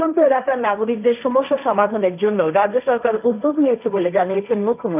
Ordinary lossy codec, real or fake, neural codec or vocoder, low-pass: none; fake; codec, 16 kHz, 1.1 kbps, Voila-Tokenizer; 3.6 kHz